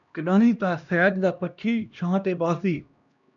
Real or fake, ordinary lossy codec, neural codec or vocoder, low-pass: fake; MP3, 96 kbps; codec, 16 kHz, 1 kbps, X-Codec, HuBERT features, trained on LibriSpeech; 7.2 kHz